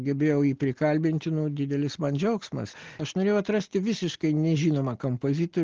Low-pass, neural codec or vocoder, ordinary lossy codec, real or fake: 7.2 kHz; none; Opus, 16 kbps; real